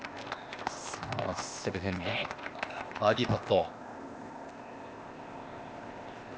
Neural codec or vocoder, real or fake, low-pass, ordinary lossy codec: codec, 16 kHz, 0.8 kbps, ZipCodec; fake; none; none